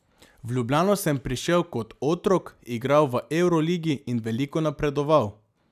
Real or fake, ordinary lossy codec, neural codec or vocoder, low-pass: real; none; none; 14.4 kHz